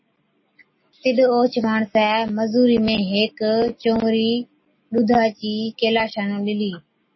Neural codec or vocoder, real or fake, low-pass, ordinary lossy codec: none; real; 7.2 kHz; MP3, 24 kbps